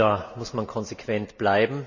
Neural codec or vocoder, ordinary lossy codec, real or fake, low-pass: none; none; real; 7.2 kHz